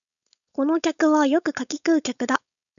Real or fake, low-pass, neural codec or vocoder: fake; 7.2 kHz; codec, 16 kHz, 4.8 kbps, FACodec